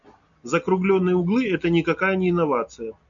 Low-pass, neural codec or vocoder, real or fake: 7.2 kHz; none; real